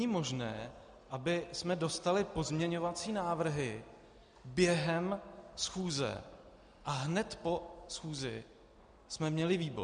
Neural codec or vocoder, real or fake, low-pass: none; real; 9.9 kHz